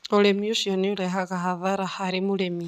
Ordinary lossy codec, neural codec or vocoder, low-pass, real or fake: none; none; 14.4 kHz; real